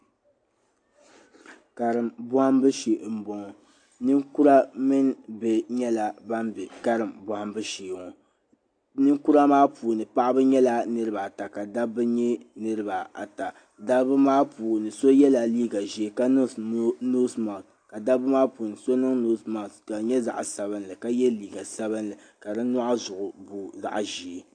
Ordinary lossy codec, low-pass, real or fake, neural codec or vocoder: AAC, 48 kbps; 9.9 kHz; real; none